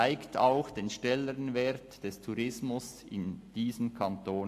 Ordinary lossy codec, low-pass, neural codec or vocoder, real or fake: AAC, 64 kbps; 14.4 kHz; none; real